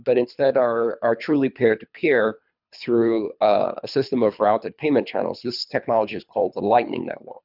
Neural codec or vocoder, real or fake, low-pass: codec, 24 kHz, 3 kbps, HILCodec; fake; 5.4 kHz